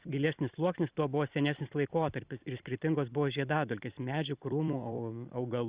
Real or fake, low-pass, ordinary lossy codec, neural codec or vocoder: fake; 3.6 kHz; Opus, 64 kbps; vocoder, 44.1 kHz, 128 mel bands every 256 samples, BigVGAN v2